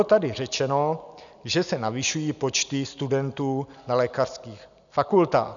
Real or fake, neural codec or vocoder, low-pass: real; none; 7.2 kHz